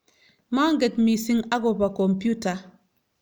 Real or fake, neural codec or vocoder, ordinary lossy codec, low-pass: real; none; none; none